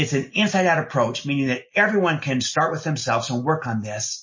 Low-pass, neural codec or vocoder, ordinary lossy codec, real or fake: 7.2 kHz; none; MP3, 32 kbps; real